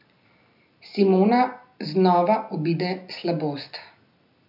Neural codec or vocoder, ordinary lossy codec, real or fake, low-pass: none; none; real; 5.4 kHz